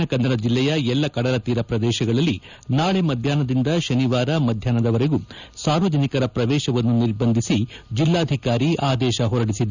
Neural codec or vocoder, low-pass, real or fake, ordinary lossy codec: none; 7.2 kHz; real; none